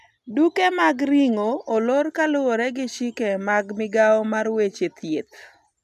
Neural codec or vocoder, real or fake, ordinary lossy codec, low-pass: none; real; none; 14.4 kHz